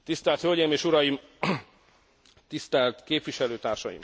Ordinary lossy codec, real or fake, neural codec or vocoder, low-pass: none; real; none; none